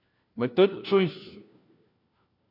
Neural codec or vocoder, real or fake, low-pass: codec, 16 kHz, 1 kbps, FunCodec, trained on LibriTTS, 50 frames a second; fake; 5.4 kHz